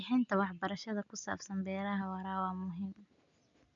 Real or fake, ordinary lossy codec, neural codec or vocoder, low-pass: real; none; none; 7.2 kHz